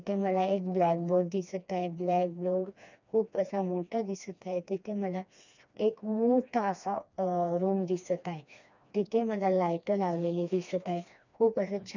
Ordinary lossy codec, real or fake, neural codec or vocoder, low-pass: none; fake; codec, 16 kHz, 2 kbps, FreqCodec, smaller model; 7.2 kHz